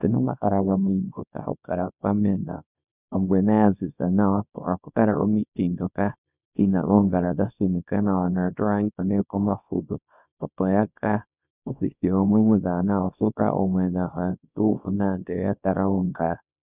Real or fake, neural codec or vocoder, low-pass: fake; codec, 24 kHz, 0.9 kbps, WavTokenizer, small release; 3.6 kHz